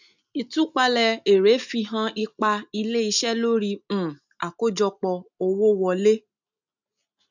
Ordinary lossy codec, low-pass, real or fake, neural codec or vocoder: none; 7.2 kHz; real; none